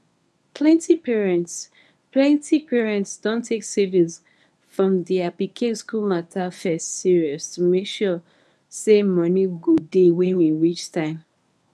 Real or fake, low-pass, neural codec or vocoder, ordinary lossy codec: fake; none; codec, 24 kHz, 0.9 kbps, WavTokenizer, medium speech release version 1; none